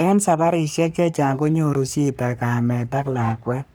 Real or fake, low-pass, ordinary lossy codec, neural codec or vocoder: fake; none; none; codec, 44.1 kHz, 3.4 kbps, Pupu-Codec